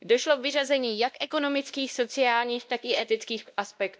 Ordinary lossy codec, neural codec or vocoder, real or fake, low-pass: none; codec, 16 kHz, 1 kbps, X-Codec, WavLM features, trained on Multilingual LibriSpeech; fake; none